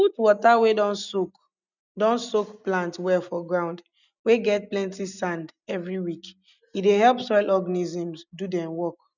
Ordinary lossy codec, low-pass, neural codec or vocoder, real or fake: none; 7.2 kHz; none; real